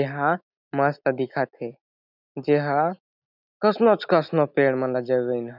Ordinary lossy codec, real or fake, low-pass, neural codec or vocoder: none; real; 5.4 kHz; none